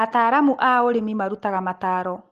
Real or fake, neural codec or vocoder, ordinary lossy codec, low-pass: real; none; Opus, 24 kbps; 14.4 kHz